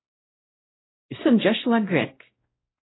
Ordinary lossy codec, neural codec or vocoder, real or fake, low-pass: AAC, 16 kbps; codec, 16 kHz, 0.5 kbps, X-Codec, WavLM features, trained on Multilingual LibriSpeech; fake; 7.2 kHz